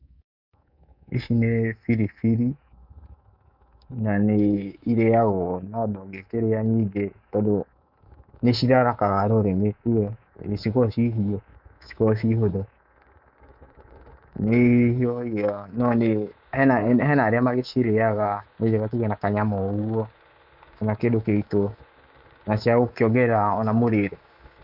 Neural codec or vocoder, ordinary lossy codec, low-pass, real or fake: codec, 24 kHz, 3.1 kbps, DualCodec; none; 5.4 kHz; fake